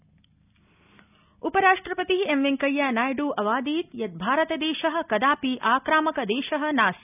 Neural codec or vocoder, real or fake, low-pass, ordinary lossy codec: none; real; 3.6 kHz; none